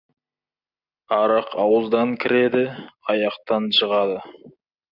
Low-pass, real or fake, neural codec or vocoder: 5.4 kHz; real; none